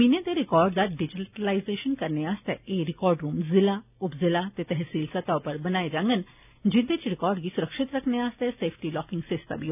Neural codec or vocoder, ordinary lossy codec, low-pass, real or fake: none; none; 3.6 kHz; real